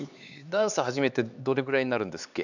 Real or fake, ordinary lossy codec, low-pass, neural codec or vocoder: fake; none; 7.2 kHz; codec, 16 kHz, 4 kbps, X-Codec, HuBERT features, trained on LibriSpeech